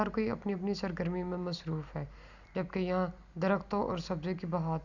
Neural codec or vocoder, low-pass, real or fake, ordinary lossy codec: none; 7.2 kHz; real; Opus, 64 kbps